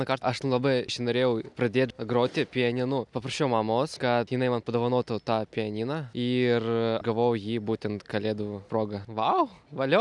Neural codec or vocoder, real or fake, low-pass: none; real; 10.8 kHz